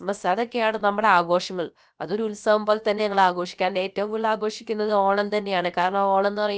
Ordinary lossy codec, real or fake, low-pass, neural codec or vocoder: none; fake; none; codec, 16 kHz, about 1 kbps, DyCAST, with the encoder's durations